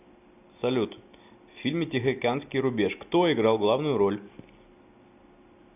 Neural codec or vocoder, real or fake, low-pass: none; real; 3.6 kHz